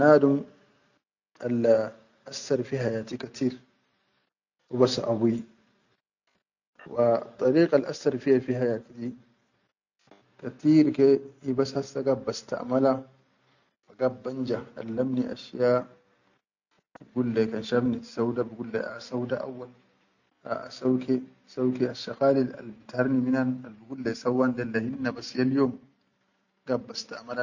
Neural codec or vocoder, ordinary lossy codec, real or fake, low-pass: none; none; real; 7.2 kHz